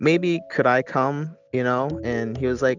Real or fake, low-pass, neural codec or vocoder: real; 7.2 kHz; none